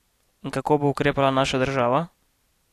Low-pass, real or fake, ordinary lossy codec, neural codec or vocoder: 14.4 kHz; real; AAC, 64 kbps; none